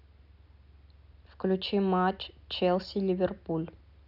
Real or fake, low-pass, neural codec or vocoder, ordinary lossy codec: real; 5.4 kHz; none; none